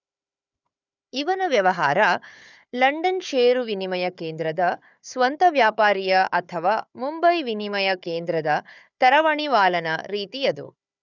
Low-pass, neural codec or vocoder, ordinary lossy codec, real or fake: 7.2 kHz; codec, 16 kHz, 4 kbps, FunCodec, trained on Chinese and English, 50 frames a second; none; fake